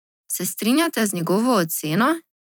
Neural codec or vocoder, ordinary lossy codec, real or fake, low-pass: vocoder, 44.1 kHz, 128 mel bands every 256 samples, BigVGAN v2; none; fake; none